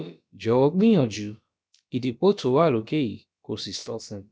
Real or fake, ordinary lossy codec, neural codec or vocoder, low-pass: fake; none; codec, 16 kHz, about 1 kbps, DyCAST, with the encoder's durations; none